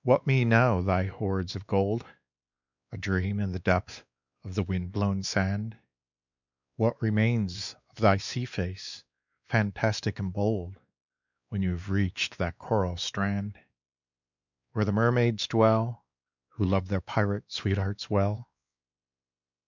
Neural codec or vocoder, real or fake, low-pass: codec, 16 kHz, 2 kbps, X-Codec, WavLM features, trained on Multilingual LibriSpeech; fake; 7.2 kHz